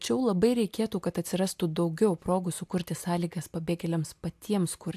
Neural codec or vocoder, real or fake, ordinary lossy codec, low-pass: none; real; AAC, 96 kbps; 14.4 kHz